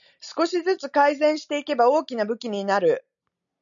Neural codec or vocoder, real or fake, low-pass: none; real; 7.2 kHz